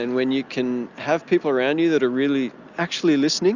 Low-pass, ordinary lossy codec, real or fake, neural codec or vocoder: 7.2 kHz; Opus, 64 kbps; real; none